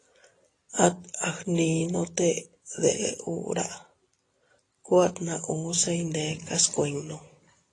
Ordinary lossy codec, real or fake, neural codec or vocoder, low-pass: AAC, 32 kbps; real; none; 10.8 kHz